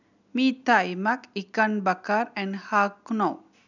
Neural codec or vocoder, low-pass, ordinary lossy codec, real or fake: none; 7.2 kHz; none; real